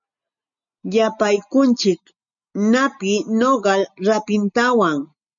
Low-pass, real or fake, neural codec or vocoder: 7.2 kHz; real; none